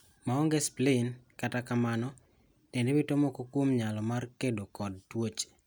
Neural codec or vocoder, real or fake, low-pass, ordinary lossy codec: none; real; none; none